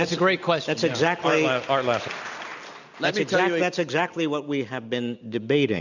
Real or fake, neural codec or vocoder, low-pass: real; none; 7.2 kHz